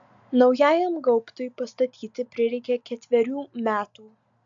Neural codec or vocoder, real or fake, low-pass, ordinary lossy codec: none; real; 7.2 kHz; AAC, 64 kbps